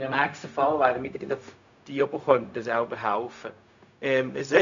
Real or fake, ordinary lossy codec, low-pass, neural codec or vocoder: fake; MP3, 64 kbps; 7.2 kHz; codec, 16 kHz, 0.4 kbps, LongCat-Audio-Codec